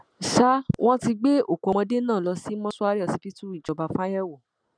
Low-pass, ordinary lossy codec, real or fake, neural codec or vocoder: 9.9 kHz; none; real; none